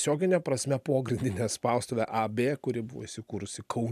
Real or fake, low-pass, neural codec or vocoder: real; 14.4 kHz; none